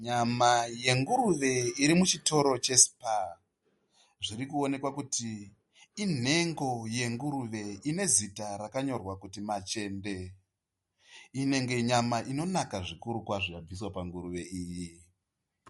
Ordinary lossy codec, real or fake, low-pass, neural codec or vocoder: MP3, 48 kbps; real; 14.4 kHz; none